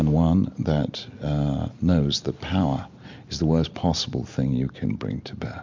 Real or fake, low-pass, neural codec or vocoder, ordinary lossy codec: real; 7.2 kHz; none; MP3, 64 kbps